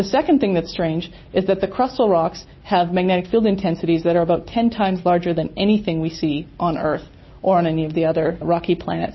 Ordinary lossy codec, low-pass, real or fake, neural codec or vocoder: MP3, 24 kbps; 7.2 kHz; real; none